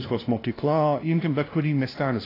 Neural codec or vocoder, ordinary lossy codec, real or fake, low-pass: codec, 16 kHz, 0.5 kbps, FunCodec, trained on LibriTTS, 25 frames a second; AAC, 24 kbps; fake; 5.4 kHz